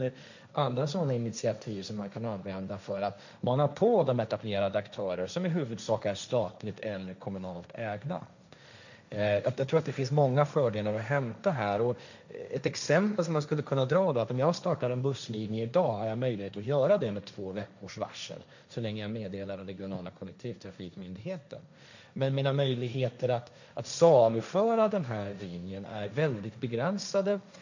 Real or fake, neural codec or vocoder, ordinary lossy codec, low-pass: fake; codec, 16 kHz, 1.1 kbps, Voila-Tokenizer; none; 7.2 kHz